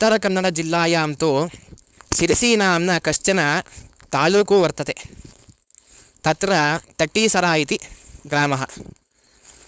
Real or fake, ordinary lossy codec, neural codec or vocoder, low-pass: fake; none; codec, 16 kHz, 4.8 kbps, FACodec; none